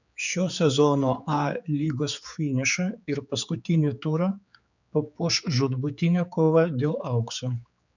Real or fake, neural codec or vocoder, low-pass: fake; codec, 16 kHz, 4 kbps, X-Codec, HuBERT features, trained on general audio; 7.2 kHz